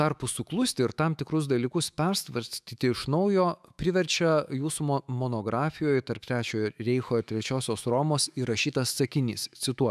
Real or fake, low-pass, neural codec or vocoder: fake; 14.4 kHz; autoencoder, 48 kHz, 128 numbers a frame, DAC-VAE, trained on Japanese speech